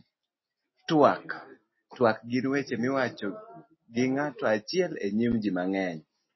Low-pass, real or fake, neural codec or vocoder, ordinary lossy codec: 7.2 kHz; real; none; MP3, 24 kbps